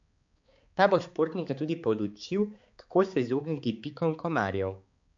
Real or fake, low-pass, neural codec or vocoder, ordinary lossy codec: fake; 7.2 kHz; codec, 16 kHz, 4 kbps, X-Codec, HuBERT features, trained on balanced general audio; MP3, 48 kbps